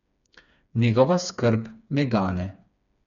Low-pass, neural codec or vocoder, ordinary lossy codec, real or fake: 7.2 kHz; codec, 16 kHz, 4 kbps, FreqCodec, smaller model; none; fake